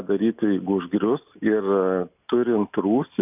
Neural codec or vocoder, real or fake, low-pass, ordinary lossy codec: none; real; 3.6 kHz; AAC, 32 kbps